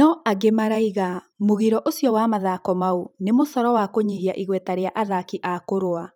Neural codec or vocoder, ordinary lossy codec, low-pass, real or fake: vocoder, 44.1 kHz, 128 mel bands every 512 samples, BigVGAN v2; none; 19.8 kHz; fake